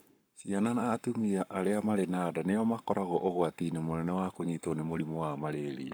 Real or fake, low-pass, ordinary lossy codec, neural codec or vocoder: fake; none; none; codec, 44.1 kHz, 7.8 kbps, Pupu-Codec